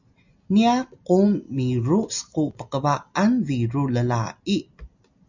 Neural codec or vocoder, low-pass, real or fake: none; 7.2 kHz; real